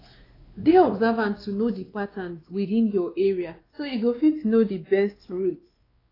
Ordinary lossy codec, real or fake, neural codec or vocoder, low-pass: AAC, 24 kbps; fake; codec, 16 kHz, 2 kbps, X-Codec, WavLM features, trained on Multilingual LibriSpeech; 5.4 kHz